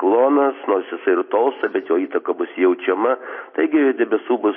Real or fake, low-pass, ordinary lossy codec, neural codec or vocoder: real; 7.2 kHz; MP3, 24 kbps; none